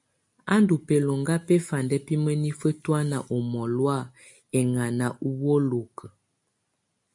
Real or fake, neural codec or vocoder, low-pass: real; none; 10.8 kHz